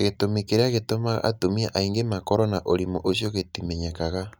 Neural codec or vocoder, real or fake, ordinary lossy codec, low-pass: none; real; none; none